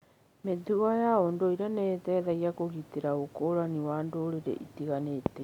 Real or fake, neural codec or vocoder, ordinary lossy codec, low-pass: real; none; MP3, 96 kbps; 19.8 kHz